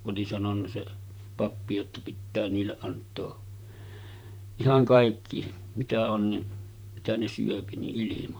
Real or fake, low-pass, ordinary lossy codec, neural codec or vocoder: fake; none; none; vocoder, 44.1 kHz, 128 mel bands, Pupu-Vocoder